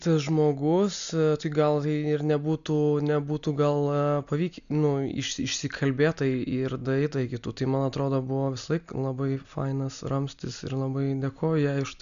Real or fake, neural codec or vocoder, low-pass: real; none; 7.2 kHz